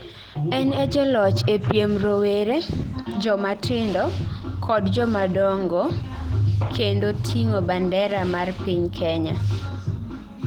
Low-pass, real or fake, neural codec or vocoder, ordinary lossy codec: 19.8 kHz; real; none; Opus, 16 kbps